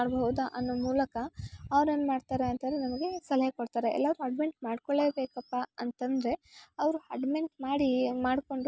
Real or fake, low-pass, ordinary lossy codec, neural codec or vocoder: real; none; none; none